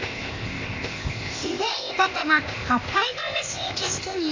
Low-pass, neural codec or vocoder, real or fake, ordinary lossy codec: 7.2 kHz; codec, 16 kHz, 0.8 kbps, ZipCodec; fake; AAC, 48 kbps